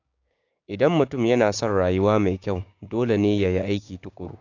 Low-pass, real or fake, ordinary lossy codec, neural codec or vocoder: 7.2 kHz; fake; AAC, 48 kbps; codec, 16 kHz, 6 kbps, DAC